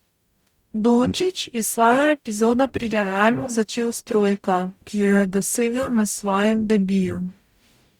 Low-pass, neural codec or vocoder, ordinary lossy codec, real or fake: 19.8 kHz; codec, 44.1 kHz, 0.9 kbps, DAC; Opus, 64 kbps; fake